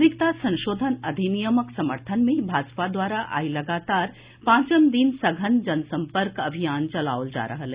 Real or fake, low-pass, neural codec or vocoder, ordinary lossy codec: real; 3.6 kHz; none; Opus, 64 kbps